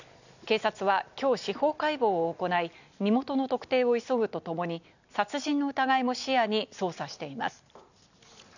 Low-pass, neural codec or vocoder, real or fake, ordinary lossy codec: 7.2 kHz; none; real; none